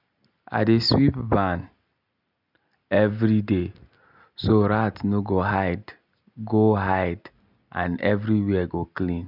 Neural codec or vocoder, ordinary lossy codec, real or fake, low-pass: none; none; real; 5.4 kHz